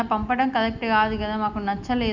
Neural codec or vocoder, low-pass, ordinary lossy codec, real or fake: none; 7.2 kHz; none; real